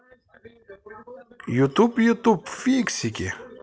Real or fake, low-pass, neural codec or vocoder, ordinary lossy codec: real; none; none; none